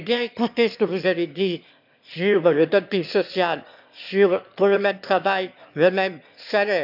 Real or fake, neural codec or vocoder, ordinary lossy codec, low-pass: fake; autoencoder, 22.05 kHz, a latent of 192 numbers a frame, VITS, trained on one speaker; none; 5.4 kHz